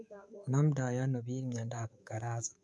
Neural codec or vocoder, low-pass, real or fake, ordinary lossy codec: codec, 24 kHz, 3.1 kbps, DualCodec; none; fake; none